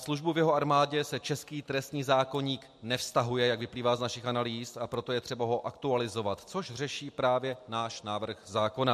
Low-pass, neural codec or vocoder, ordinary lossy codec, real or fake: 14.4 kHz; none; MP3, 64 kbps; real